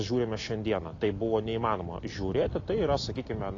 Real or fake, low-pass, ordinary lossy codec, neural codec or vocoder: real; 7.2 kHz; AAC, 32 kbps; none